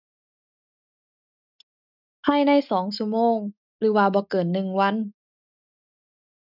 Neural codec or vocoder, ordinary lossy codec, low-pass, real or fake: none; none; 5.4 kHz; real